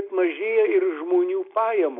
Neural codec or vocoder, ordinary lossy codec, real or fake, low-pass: none; AAC, 32 kbps; real; 5.4 kHz